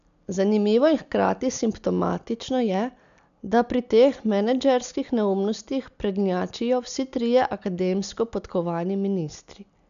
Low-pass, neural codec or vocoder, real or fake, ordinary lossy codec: 7.2 kHz; none; real; none